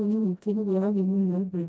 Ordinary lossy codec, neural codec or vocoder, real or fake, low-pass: none; codec, 16 kHz, 0.5 kbps, FreqCodec, smaller model; fake; none